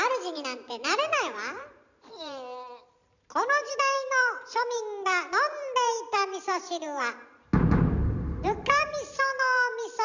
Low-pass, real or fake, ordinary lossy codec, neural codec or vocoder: 7.2 kHz; fake; none; vocoder, 44.1 kHz, 128 mel bands every 512 samples, BigVGAN v2